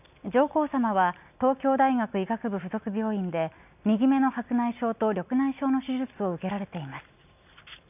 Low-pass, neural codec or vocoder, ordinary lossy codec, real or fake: 3.6 kHz; none; none; real